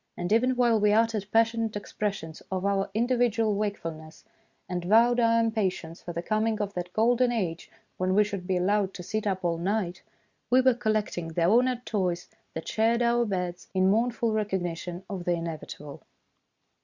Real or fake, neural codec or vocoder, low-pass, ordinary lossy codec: real; none; 7.2 kHz; Opus, 64 kbps